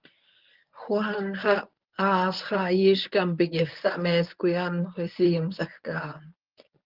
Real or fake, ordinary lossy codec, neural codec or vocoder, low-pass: fake; Opus, 24 kbps; codec, 24 kHz, 0.9 kbps, WavTokenizer, medium speech release version 1; 5.4 kHz